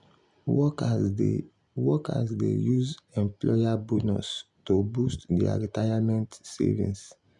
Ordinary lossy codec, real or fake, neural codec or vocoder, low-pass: none; real; none; 10.8 kHz